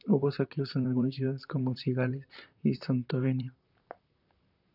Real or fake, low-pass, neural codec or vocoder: fake; 5.4 kHz; vocoder, 44.1 kHz, 128 mel bands, Pupu-Vocoder